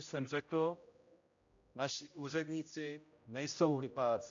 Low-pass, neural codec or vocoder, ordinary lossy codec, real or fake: 7.2 kHz; codec, 16 kHz, 0.5 kbps, X-Codec, HuBERT features, trained on general audio; AAC, 48 kbps; fake